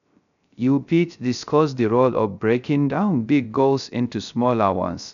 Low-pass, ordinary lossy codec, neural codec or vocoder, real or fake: 7.2 kHz; none; codec, 16 kHz, 0.3 kbps, FocalCodec; fake